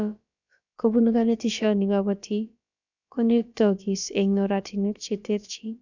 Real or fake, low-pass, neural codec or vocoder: fake; 7.2 kHz; codec, 16 kHz, about 1 kbps, DyCAST, with the encoder's durations